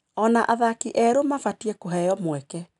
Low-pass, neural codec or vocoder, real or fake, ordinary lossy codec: 10.8 kHz; none; real; none